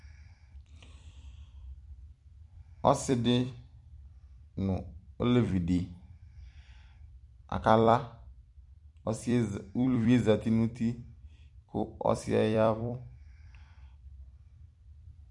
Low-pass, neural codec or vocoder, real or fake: 10.8 kHz; vocoder, 44.1 kHz, 128 mel bands every 256 samples, BigVGAN v2; fake